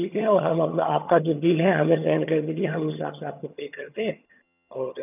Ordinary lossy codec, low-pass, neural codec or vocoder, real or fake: none; 3.6 kHz; vocoder, 22.05 kHz, 80 mel bands, HiFi-GAN; fake